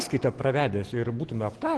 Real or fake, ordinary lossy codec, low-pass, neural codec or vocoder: real; Opus, 16 kbps; 10.8 kHz; none